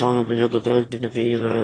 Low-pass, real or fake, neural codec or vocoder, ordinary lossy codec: 9.9 kHz; fake; autoencoder, 22.05 kHz, a latent of 192 numbers a frame, VITS, trained on one speaker; AAC, 32 kbps